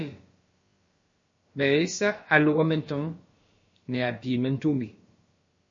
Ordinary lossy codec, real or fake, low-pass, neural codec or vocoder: MP3, 32 kbps; fake; 7.2 kHz; codec, 16 kHz, about 1 kbps, DyCAST, with the encoder's durations